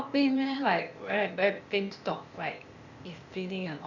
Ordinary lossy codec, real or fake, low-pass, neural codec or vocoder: Opus, 64 kbps; fake; 7.2 kHz; codec, 16 kHz, 0.8 kbps, ZipCodec